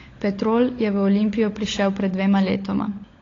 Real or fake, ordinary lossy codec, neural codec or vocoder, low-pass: fake; AAC, 32 kbps; codec, 16 kHz, 16 kbps, FunCodec, trained on LibriTTS, 50 frames a second; 7.2 kHz